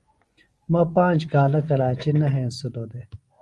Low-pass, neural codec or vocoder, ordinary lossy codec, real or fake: 10.8 kHz; vocoder, 24 kHz, 100 mel bands, Vocos; Opus, 32 kbps; fake